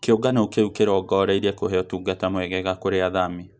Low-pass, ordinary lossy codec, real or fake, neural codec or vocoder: none; none; real; none